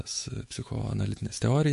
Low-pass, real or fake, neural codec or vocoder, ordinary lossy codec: 14.4 kHz; fake; autoencoder, 48 kHz, 128 numbers a frame, DAC-VAE, trained on Japanese speech; MP3, 48 kbps